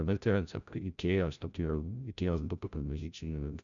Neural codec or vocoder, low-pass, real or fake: codec, 16 kHz, 0.5 kbps, FreqCodec, larger model; 7.2 kHz; fake